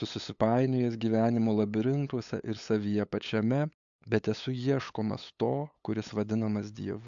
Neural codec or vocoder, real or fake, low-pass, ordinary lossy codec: codec, 16 kHz, 8 kbps, FunCodec, trained on LibriTTS, 25 frames a second; fake; 7.2 kHz; AAC, 64 kbps